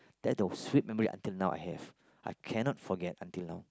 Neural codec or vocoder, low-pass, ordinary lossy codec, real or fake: none; none; none; real